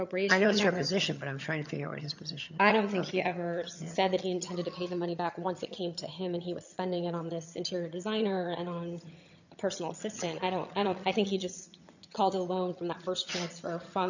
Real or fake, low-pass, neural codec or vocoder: fake; 7.2 kHz; vocoder, 22.05 kHz, 80 mel bands, HiFi-GAN